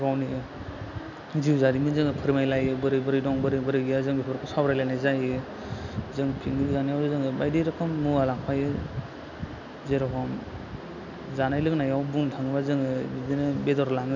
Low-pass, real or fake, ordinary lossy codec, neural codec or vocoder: 7.2 kHz; real; none; none